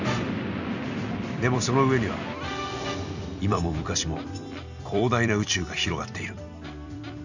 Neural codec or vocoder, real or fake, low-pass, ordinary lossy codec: vocoder, 44.1 kHz, 128 mel bands every 256 samples, BigVGAN v2; fake; 7.2 kHz; none